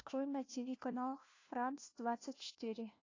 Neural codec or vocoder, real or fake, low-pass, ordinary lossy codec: codec, 16 kHz, 1 kbps, FunCodec, trained on LibriTTS, 50 frames a second; fake; 7.2 kHz; AAC, 32 kbps